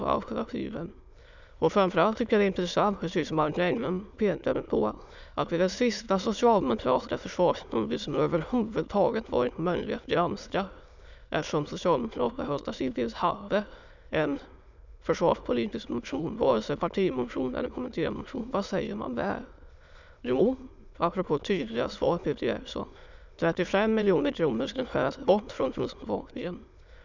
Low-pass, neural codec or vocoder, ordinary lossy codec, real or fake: 7.2 kHz; autoencoder, 22.05 kHz, a latent of 192 numbers a frame, VITS, trained on many speakers; none; fake